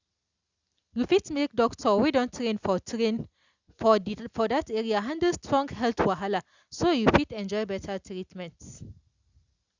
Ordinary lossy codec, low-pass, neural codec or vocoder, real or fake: Opus, 64 kbps; 7.2 kHz; none; real